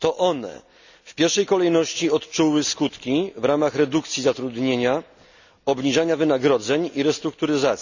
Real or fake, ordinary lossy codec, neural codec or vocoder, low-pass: real; none; none; 7.2 kHz